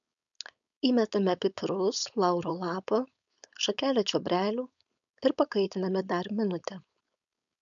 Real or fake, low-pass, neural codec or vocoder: fake; 7.2 kHz; codec, 16 kHz, 4.8 kbps, FACodec